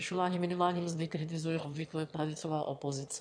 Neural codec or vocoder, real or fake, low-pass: autoencoder, 22.05 kHz, a latent of 192 numbers a frame, VITS, trained on one speaker; fake; 9.9 kHz